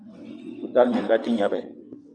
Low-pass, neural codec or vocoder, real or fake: 9.9 kHz; vocoder, 22.05 kHz, 80 mel bands, WaveNeXt; fake